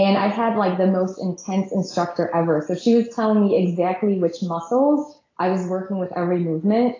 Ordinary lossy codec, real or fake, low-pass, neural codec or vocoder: AAC, 32 kbps; real; 7.2 kHz; none